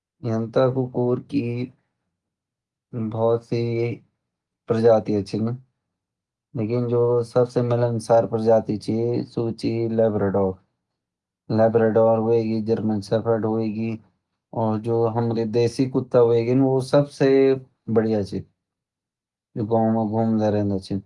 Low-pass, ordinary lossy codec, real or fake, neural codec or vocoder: 10.8 kHz; Opus, 32 kbps; real; none